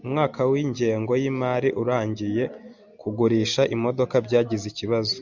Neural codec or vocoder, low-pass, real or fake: none; 7.2 kHz; real